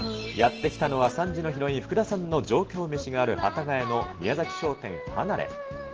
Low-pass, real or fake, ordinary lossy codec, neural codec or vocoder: 7.2 kHz; real; Opus, 16 kbps; none